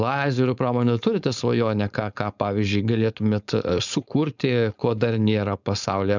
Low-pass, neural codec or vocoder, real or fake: 7.2 kHz; codec, 16 kHz, 4.8 kbps, FACodec; fake